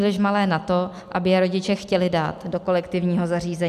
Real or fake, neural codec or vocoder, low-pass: real; none; 14.4 kHz